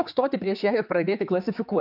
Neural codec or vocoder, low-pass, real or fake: codec, 16 kHz, 4 kbps, X-Codec, HuBERT features, trained on general audio; 5.4 kHz; fake